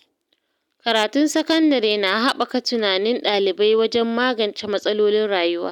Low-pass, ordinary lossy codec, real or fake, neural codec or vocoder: 19.8 kHz; none; real; none